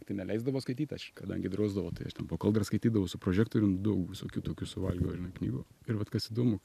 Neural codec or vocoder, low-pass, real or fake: none; 14.4 kHz; real